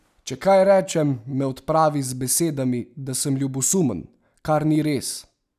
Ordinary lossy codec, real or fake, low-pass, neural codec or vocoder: none; real; 14.4 kHz; none